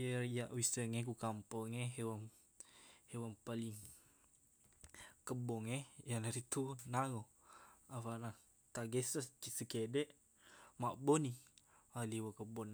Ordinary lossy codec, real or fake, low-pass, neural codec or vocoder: none; real; none; none